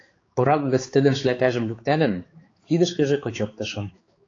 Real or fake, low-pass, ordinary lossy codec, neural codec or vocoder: fake; 7.2 kHz; AAC, 32 kbps; codec, 16 kHz, 4 kbps, X-Codec, HuBERT features, trained on balanced general audio